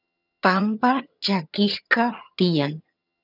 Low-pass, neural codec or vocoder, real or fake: 5.4 kHz; vocoder, 22.05 kHz, 80 mel bands, HiFi-GAN; fake